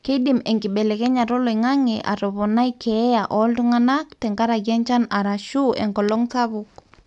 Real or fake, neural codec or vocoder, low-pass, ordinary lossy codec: real; none; 10.8 kHz; none